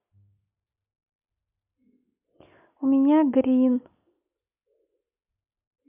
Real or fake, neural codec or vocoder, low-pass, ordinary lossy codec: real; none; 3.6 kHz; MP3, 32 kbps